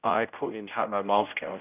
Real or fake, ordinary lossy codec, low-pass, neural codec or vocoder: fake; none; 3.6 kHz; codec, 16 kHz, 0.5 kbps, X-Codec, HuBERT features, trained on general audio